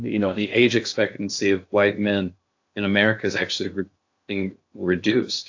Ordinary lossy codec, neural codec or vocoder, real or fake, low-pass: AAC, 48 kbps; codec, 16 kHz in and 24 kHz out, 0.6 kbps, FocalCodec, streaming, 2048 codes; fake; 7.2 kHz